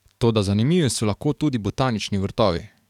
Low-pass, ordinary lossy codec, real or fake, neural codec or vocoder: 19.8 kHz; none; fake; codec, 44.1 kHz, 7.8 kbps, DAC